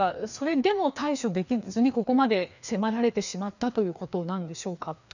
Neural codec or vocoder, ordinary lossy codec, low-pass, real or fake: codec, 16 kHz, 2 kbps, FreqCodec, larger model; none; 7.2 kHz; fake